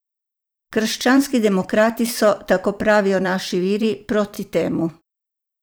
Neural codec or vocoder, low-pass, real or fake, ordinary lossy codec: vocoder, 44.1 kHz, 128 mel bands every 512 samples, BigVGAN v2; none; fake; none